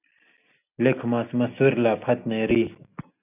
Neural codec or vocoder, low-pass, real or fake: none; 3.6 kHz; real